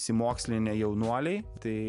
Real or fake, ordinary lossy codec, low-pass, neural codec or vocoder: real; MP3, 96 kbps; 10.8 kHz; none